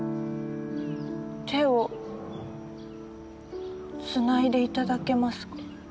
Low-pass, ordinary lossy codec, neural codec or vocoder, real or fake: none; none; none; real